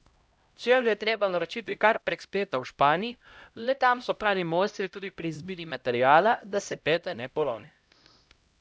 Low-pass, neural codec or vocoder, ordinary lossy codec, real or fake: none; codec, 16 kHz, 0.5 kbps, X-Codec, HuBERT features, trained on LibriSpeech; none; fake